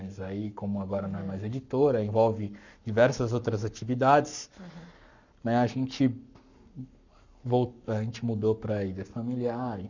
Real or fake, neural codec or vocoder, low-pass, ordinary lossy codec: fake; codec, 44.1 kHz, 7.8 kbps, Pupu-Codec; 7.2 kHz; none